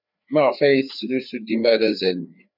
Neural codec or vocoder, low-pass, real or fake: codec, 16 kHz, 4 kbps, FreqCodec, larger model; 5.4 kHz; fake